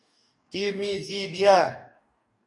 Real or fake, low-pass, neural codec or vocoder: fake; 10.8 kHz; codec, 44.1 kHz, 2.6 kbps, DAC